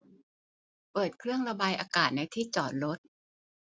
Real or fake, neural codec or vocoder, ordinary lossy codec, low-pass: real; none; none; none